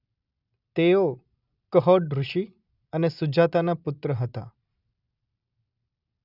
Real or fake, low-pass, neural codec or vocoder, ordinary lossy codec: real; 5.4 kHz; none; none